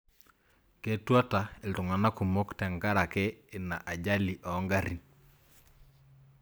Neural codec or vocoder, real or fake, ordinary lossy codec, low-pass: none; real; none; none